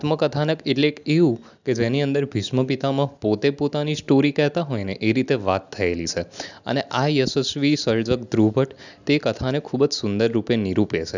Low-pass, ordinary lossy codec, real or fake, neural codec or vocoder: 7.2 kHz; none; real; none